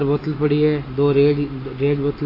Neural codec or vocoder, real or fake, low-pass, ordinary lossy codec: none; real; 5.4 kHz; AAC, 24 kbps